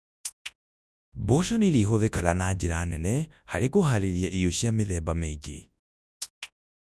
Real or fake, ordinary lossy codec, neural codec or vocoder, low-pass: fake; none; codec, 24 kHz, 0.9 kbps, WavTokenizer, large speech release; none